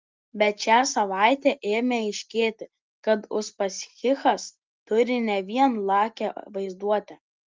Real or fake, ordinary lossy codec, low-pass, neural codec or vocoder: real; Opus, 32 kbps; 7.2 kHz; none